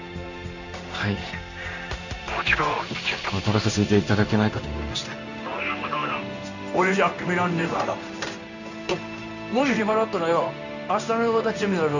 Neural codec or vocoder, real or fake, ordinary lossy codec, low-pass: codec, 16 kHz in and 24 kHz out, 1 kbps, XY-Tokenizer; fake; none; 7.2 kHz